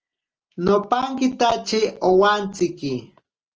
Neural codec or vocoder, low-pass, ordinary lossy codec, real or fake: none; 7.2 kHz; Opus, 24 kbps; real